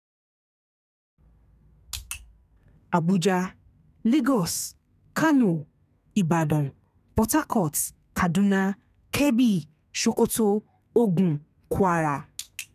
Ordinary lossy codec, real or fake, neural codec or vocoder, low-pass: none; fake; codec, 32 kHz, 1.9 kbps, SNAC; 14.4 kHz